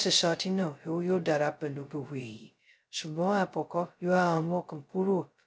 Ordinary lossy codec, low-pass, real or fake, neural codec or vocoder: none; none; fake; codec, 16 kHz, 0.2 kbps, FocalCodec